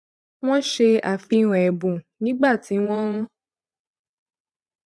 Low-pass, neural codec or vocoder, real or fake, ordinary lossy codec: none; vocoder, 22.05 kHz, 80 mel bands, Vocos; fake; none